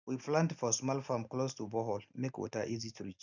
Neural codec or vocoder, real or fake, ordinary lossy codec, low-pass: none; real; none; 7.2 kHz